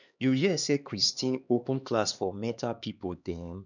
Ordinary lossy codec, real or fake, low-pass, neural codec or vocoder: none; fake; 7.2 kHz; codec, 16 kHz, 2 kbps, X-Codec, HuBERT features, trained on LibriSpeech